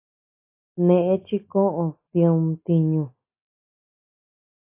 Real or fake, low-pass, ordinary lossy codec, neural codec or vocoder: real; 3.6 kHz; AAC, 24 kbps; none